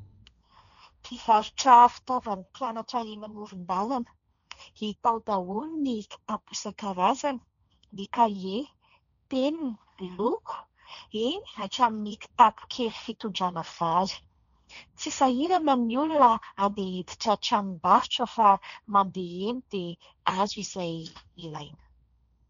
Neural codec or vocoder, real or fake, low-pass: codec, 16 kHz, 1.1 kbps, Voila-Tokenizer; fake; 7.2 kHz